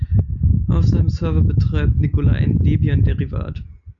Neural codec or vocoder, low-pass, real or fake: none; 7.2 kHz; real